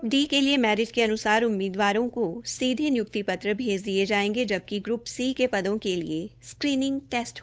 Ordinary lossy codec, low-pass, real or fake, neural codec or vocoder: none; none; fake; codec, 16 kHz, 8 kbps, FunCodec, trained on Chinese and English, 25 frames a second